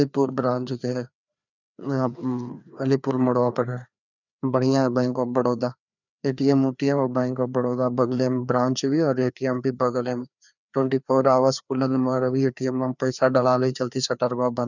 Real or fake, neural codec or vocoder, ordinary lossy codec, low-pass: fake; codec, 16 kHz, 2 kbps, FreqCodec, larger model; none; 7.2 kHz